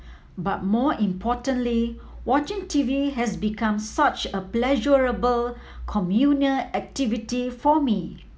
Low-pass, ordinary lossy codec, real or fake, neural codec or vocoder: none; none; real; none